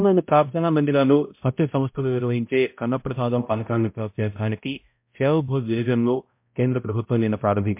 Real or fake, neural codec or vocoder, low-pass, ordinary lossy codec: fake; codec, 16 kHz, 0.5 kbps, X-Codec, HuBERT features, trained on balanced general audio; 3.6 kHz; MP3, 32 kbps